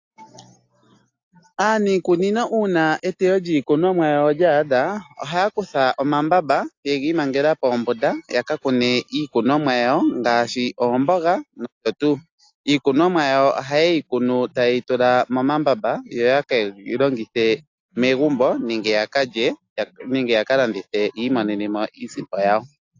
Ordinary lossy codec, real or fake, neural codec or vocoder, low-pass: AAC, 48 kbps; real; none; 7.2 kHz